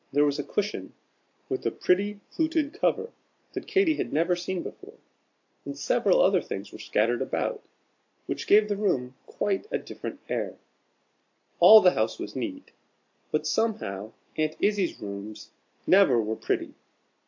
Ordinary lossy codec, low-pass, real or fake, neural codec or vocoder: AAC, 48 kbps; 7.2 kHz; real; none